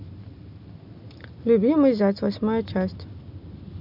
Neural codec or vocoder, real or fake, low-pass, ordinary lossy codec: none; real; 5.4 kHz; none